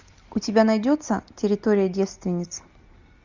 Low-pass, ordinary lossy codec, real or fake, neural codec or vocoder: 7.2 kHz; Opus, 64 kbps; real; none